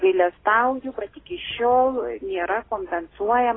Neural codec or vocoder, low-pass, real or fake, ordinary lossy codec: none; 7.2 kHz; real; AAC, 16 kbps